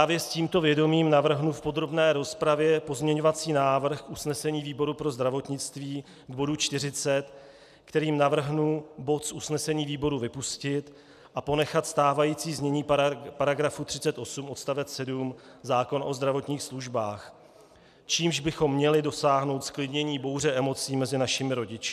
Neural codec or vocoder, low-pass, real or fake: none; 14.4 kHz; real